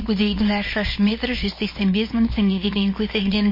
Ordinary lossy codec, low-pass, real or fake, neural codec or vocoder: MP3, 24 kbps; 5.4 kHz; fake; codec, 24 kHz, 0.9 kbps, WavTokenizer, small release